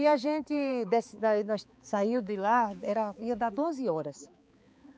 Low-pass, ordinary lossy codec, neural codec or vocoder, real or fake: none; none; codec, 16 kHz, 4 kbps, X-Codec, HuBERT features, trained on balanced general audio; fake